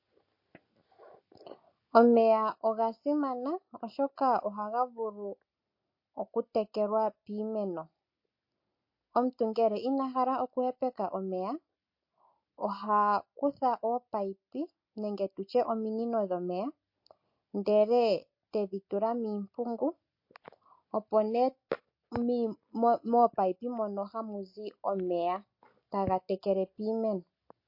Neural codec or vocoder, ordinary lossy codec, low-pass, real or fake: none; MP3, 32 kbps; 5.4 kHz; real